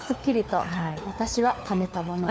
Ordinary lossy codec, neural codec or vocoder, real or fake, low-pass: none; codec, 16 kHz, 4 kbps, FunCodec, trained on LibriTTS, 50 frames a second; fake; none